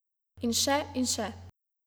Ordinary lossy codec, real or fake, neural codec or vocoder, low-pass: none; real; none; none